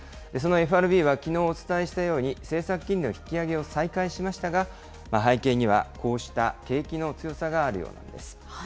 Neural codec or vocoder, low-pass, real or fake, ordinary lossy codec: none; none; real; none